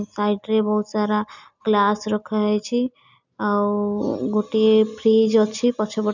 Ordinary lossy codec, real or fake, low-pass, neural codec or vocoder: none; real; 7.2 kHz; none